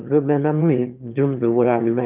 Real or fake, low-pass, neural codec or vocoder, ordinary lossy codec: fake; 3.6 kHz; autoencoder, 22.05 kHz, a latent of 192 numbers a frame, VITS, trained on one speaker; Opus, 24 kbps